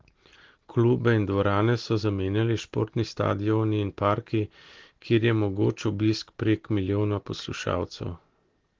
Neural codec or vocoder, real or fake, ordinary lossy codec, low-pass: none; real; Opus, 16 kbps; 7.2 kHz